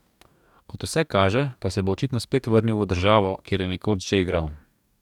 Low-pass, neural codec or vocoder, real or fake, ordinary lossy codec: 19.8 kHz; codec, 44.1 kHz, 2.6 kbps, DAC; fake; none